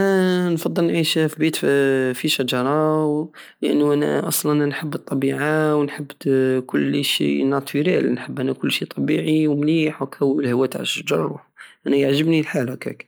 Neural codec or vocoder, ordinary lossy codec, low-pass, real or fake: none; none; none; real